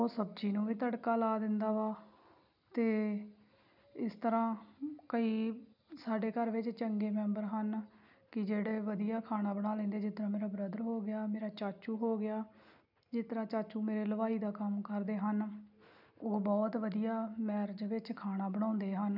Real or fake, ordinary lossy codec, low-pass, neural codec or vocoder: real; none; 5.4 kHz; none